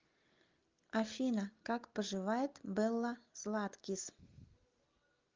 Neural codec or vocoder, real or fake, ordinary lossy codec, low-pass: none; real; Opus, 32 kbps; 7.2 kHz